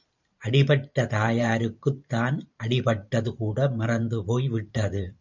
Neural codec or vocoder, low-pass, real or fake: vocoder, 44.1 kHz, 128 mel bands every 512 samples, BigVGAN v2; 7.2 kHz; fake